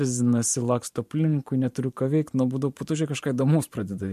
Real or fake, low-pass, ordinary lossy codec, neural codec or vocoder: fake; 14.4 kHz; MP3, 64 kbps; vocoder, 44.1 kHz, 128 mel bands every 512 samples, BigVGAN v2